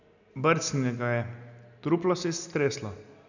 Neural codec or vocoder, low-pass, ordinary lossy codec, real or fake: none; 7.2 kHz; none; real